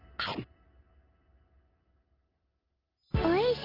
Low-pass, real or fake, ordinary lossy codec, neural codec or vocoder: 5.4 kHz; real; Opus, 32 kbps; none